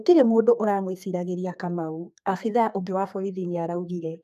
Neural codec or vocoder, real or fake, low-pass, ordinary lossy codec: codec, 32 kHz, 1.9 kbps, SNAC; fake; 14.4 kHz; none